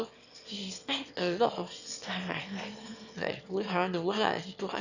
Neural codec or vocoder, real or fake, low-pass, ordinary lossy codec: autoencoder, 22.05 kHz, a latent of 192 numbers a frame, VITS, trained on one speaker; fake; 7.2 kHz; none